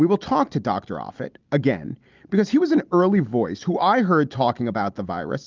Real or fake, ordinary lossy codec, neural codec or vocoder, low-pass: real; Opus, 32 kbps; none; 7.2 kHz